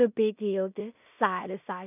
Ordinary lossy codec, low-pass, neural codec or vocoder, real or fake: none; 3.6 kHz; codec, 16 kHz in and 24 kHz out, 0.4 kbps, LongCat-Audio-Codec, two codebook decoder; fake